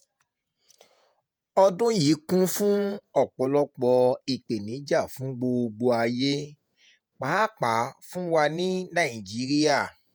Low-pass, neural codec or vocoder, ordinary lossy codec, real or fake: none; vocoder, 48 kHz, 128 mel bands, Vocos; none; fake